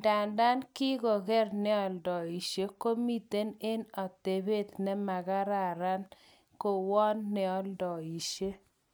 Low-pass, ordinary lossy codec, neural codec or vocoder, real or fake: none; none; none; real